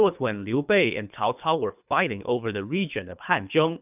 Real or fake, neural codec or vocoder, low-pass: fake; codec, 24 kHz, 3 kbps, HILCodec; 3.6 kHz